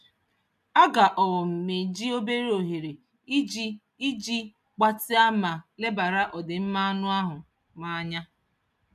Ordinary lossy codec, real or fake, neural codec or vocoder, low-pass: none; real; none; 14.4 kHz